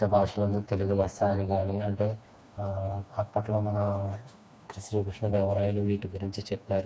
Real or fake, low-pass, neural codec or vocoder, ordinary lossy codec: fake; none; codec, 16 kHz, 2 kbps, FreqCodec, smaller model; none